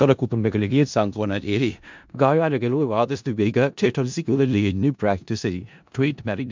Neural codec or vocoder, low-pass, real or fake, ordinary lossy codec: codec, 16 kHz in and 24 kHz out, 0.4 kbps, LongCat-Audio-Codec, four codebook decoder; 7.2 kHz; fake; MP3, 64 kbps